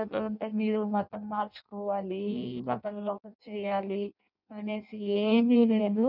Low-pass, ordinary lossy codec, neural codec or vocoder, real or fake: 5.4 kHz; none; codec, 16 kHz in and 24 kHz out, 0.6 kbps, FireRedTTS-2 codec; fake